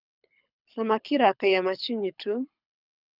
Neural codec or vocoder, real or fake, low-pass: codec, 24 kHz, 6 kbps, HILCodec; fake; 5.4 kHz